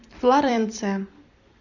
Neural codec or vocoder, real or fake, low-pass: vocoder, 44.1 kHz, 128 mel bands every 256 samples, BigVGAN v2; fake; 7.2 kHz